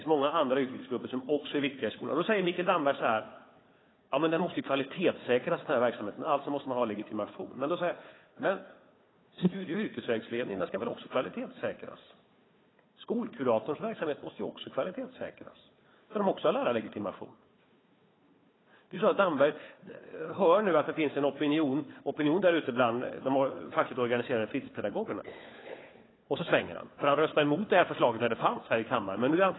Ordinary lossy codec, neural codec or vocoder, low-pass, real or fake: AAC, 16 kbps; codec, 16 kHz, 4 kbps, FunCodec, trained on Chinese and English, 50 frames a second; 7.2 kHz; fake